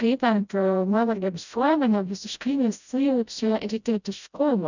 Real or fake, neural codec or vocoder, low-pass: fake; codec, 16 kHz, 0.5 kbps, FreqCodec, smaller model; 7.2 kHz